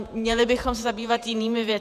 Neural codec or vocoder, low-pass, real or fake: vocoder, 48 kHz, 128 mel bands, Vocos; 14.4 kHz; fake